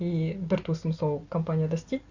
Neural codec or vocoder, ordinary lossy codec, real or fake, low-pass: none; Opus, 64 kbps; real; 7.2 kHz